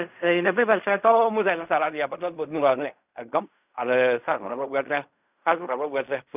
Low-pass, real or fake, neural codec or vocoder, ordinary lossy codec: 3.6 kHz; fake; codec, 16 kHz in and 24 kHz out, 0.4 kbps, LongCat-Audio-Codec, fine tuned four codebook decoder; none